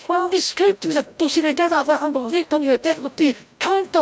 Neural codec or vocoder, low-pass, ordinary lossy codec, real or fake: codec, 16 kHz, 0.5 kbps, FreqCodec, larger model; none; none; fake